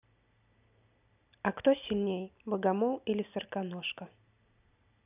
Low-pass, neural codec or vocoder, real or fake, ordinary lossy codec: 3.6 kHz; none; real; none